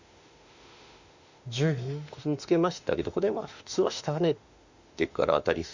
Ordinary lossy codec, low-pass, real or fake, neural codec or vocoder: Opus, 64 kbps; 7.2 kHz; fake; autoencoder, 48 kHz, 32 numbers a frame, DAC-VAE, trained on Japanese speech